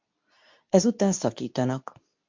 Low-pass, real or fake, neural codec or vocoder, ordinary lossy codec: 7.2 kHz; fake; codec, 24 kHz, 0.9 kbps, WavTokenizer, medium speech release version 2; MP3, 48 kbps